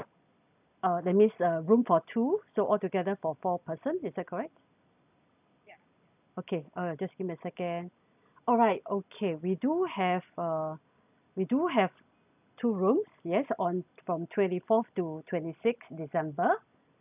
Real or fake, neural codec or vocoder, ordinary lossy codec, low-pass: real; none; none; 3.6 kHz